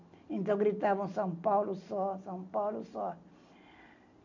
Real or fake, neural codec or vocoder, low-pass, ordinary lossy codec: real; none; 7.2 kHz; none